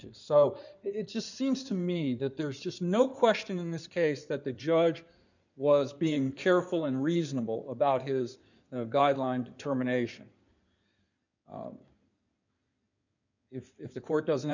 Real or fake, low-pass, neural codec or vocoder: fake; 7.2 kHz; codec, 16 kHz in and 24 kHz out, 2.2 kbps, FireRedTTS-2 codec